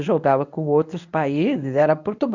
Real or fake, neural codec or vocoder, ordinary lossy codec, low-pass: fake; codec, 24 kHz, 0.9 kbps, WavTokenizer, medium speech release version 1; none; 7.2 kHz